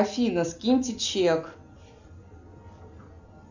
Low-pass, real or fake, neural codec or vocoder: 7.2 kHz; real; none